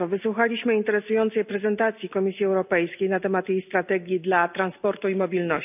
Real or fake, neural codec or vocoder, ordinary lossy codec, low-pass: real; none; none; 3.6 kHz